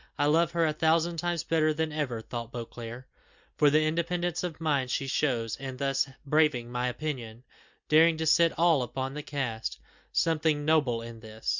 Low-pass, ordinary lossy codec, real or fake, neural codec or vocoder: 7.2 kHz; Opus, 64 kbps; real; none